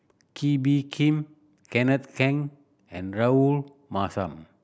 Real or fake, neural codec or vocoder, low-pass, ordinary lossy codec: real; none; none; none